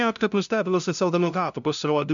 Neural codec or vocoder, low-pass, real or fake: codec, 16 kHz, 0.5 kbps, FunCodec, trained on LibriTTS, 25 frames a second; 7.2 kHz; fake